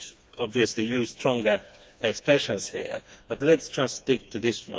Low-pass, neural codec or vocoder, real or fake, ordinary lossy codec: none; codec, 16 kHz, 2 kbps, FreqCodec, smaller model; fake; none